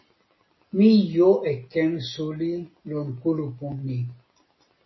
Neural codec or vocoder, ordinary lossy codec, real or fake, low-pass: none; MP3, 24 kbps; real; 7.2 kHz